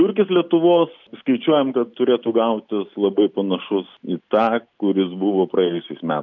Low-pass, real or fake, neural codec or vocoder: 7.2 kHz; real; none